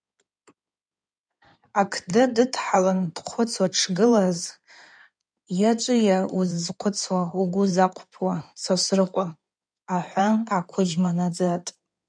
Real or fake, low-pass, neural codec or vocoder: fake; 9.9 kHz; codec, 16 kHz in and 24 kHz out, 2.2 kbps, FireRedTTS-2 codec